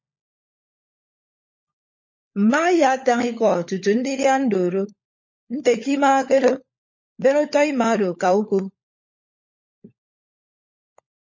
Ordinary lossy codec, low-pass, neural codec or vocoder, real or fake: MP3, 32 kbps; 7.2 kHz; codec, 16 kHz, 16 kbps, FunCodec, trained on LibriTTS, 50 frames a second; fake